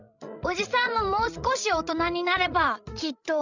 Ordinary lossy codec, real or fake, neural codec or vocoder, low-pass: none; fake; codec, 16 kHz, 16 kbps, FreqCodec, larger model; 7.2 kHz